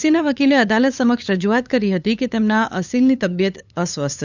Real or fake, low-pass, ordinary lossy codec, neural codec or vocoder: fake; 7.2 kHz; none; codec, 16 kHz, 8 kbps, FunCodec, trained on LibriTTS, 25 frames a second